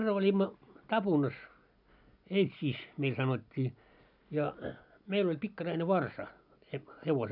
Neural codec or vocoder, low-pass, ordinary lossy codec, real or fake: none; 5.4 kHz; none; real